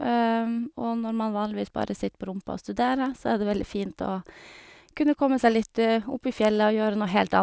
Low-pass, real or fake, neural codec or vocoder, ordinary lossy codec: none; real; none; none